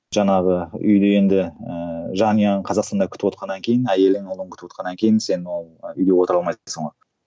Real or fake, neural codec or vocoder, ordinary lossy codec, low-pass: real; none; none; none